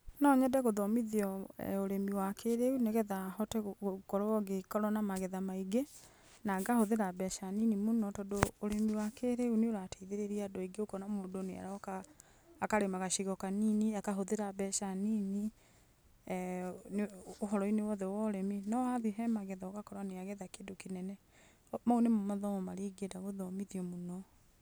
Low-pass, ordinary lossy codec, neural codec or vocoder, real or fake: none; none; none; real